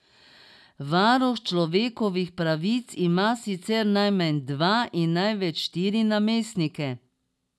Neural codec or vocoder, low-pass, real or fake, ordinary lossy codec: none; none; real; none